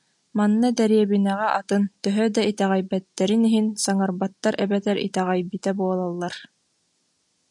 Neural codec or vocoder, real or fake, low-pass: none; real; 10.8 kHz